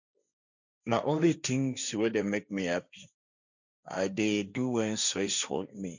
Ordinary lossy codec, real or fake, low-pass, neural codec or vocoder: none; fake; 7.2 kHz; codec, 16 kHz, 1.1 kbps, Voila-Tokenizer